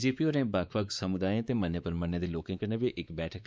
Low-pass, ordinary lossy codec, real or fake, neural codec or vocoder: none; none; fake; codec, 16 kHz, 4 kbps, X-Codec, WavLM features, trained on Multilingual LibriSpeech